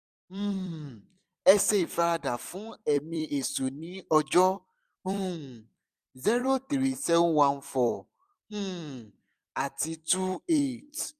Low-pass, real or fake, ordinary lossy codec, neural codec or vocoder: 14.4 kHz; fake; none; vocoder, 44.1 kHz, 128 mel bands every 256 samples, BigVGAN v2